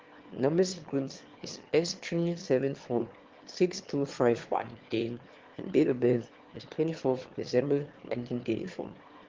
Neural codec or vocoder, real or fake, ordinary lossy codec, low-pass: autoencoder, 22.05 kHz, a latent of 192 numbers a frame, VITS, trained on one speaker; fake; Opus, 16 kbps; 7.2 kHz